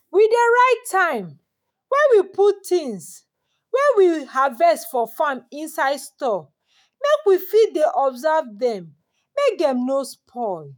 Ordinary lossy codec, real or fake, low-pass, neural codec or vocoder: none; fake; none; autoencoder, 48 kHz, 128 numbers a frame, DAC-VAE, trained on Japanese speech